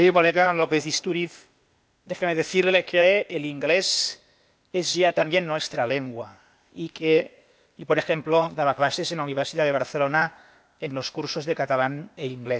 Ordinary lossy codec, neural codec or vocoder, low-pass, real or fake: none; codec, 16 kHz, 0.8 kbps, ZipCodec; none; fake